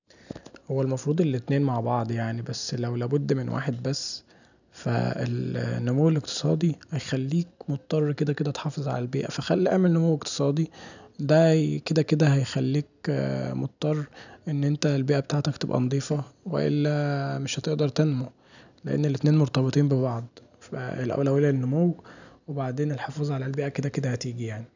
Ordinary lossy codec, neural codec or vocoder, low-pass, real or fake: none; none; 7.2 kHz; real